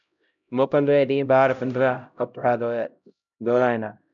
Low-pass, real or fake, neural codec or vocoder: 7.2 kHz; fake; codec, 16 kHz, 0.5 kbps, X-Codec, HuBERT features, trained on LibriSpeech